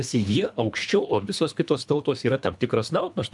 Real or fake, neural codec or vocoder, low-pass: fake; codec, 24 kHz, 3 kbps, HILCodec; 10.8 kHz